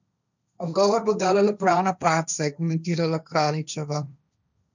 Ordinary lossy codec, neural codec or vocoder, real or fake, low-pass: none; codec, 16 kHz, 1.1 kbps, Voila-Tokenizer; fake; 7.2 kHz